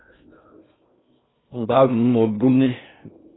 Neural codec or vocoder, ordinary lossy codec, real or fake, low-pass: codec, 16 kHz in and 24 kHz out, 0.6 kbps, FocalCodec, streaming, 2048 codes; AAC, 16 kbps; fake; 7.2 kHz